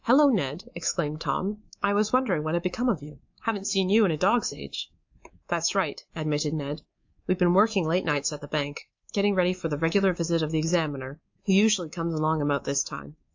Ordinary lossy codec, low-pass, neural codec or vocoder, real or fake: AAC, 48 kbps; 7.2 kHz; codec, 24 kHz, 3.1 kbps, DualCodec; fake